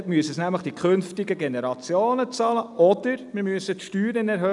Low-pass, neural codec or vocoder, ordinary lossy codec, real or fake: 10.8 kHz; none; none; real